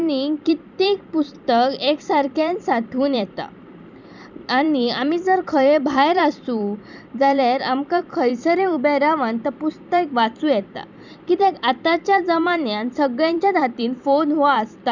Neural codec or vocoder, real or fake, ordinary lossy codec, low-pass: vocoder, 44.1 kHz, 128 mel bands every 256 samples, BigVGAN v2; fake; none; 7.2 kHz